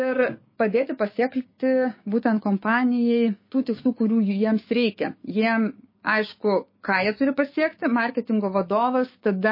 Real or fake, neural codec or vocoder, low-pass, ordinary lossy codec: fake; codec, 16 kHz, 6 kbps, DAC; 5.4 kHz; MP3, 24 kbps